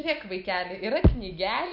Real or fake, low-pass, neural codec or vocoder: real; 5.4 kHz; none